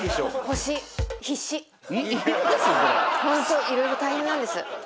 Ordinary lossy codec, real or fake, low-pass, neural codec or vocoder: none; real; none; none